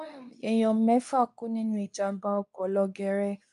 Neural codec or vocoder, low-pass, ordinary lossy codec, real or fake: codec, 24 kHz, 0.9 kbps, WavTokenizer, medium speech release version 2; 10.8 kHz; none; fake